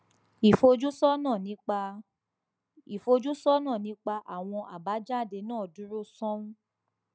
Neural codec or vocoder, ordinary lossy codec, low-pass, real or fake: none; none; none; real